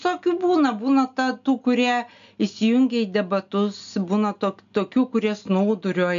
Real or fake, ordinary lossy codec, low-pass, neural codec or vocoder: real; MP3, 64 kbps; 7.2 kHz; none